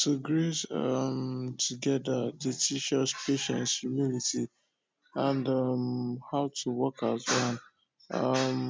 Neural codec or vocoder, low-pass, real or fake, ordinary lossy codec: none; none; real; none